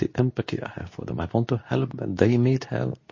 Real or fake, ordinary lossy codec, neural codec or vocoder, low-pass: fake; MP3, 32 kbps; codec, 24 kHz, 0.9 kbps, WavTokenizer, medium speech release version 2; 7.2 kHz